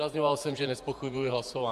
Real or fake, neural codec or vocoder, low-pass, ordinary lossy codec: fake; vocoder, 48 kHz, 128 mel bands, Vocos; 14.4 kHz; AAC, 96 kbps